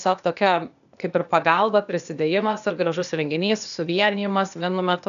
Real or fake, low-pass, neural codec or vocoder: fake; 7.2 kHz; codec, 16 kHz, 0.8 kbps, ZipCodec